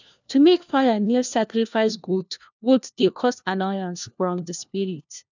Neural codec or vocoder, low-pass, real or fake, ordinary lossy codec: codec, 16 kHz, 1 kbps, FunCodec, trained on LibriTTS, 50 frames a second; 7.2 kHz; fake; none